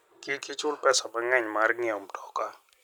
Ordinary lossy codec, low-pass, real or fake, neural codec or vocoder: none; none; real; none